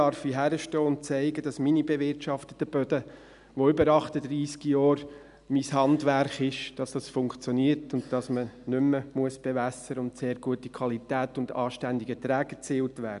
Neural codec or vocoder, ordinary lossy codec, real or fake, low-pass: none; none; real; 10.8 kHz